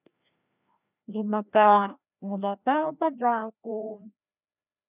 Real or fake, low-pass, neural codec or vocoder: fake; 3.6 kHz; codec, 16 kHz, 1 kbps, FreqCodec, larger model